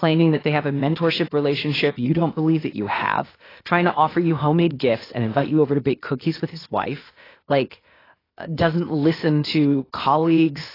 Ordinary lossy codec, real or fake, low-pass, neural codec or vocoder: AAC, 24 kbps; fake; 5.4 kHz; codec, 16 kHz, 0.8 kbps, ZipCodec